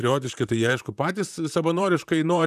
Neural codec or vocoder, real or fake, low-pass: vocoder, 44.1 kHz, 128 mel bands, Pupu-Vocoder; fake; 14.4 kHz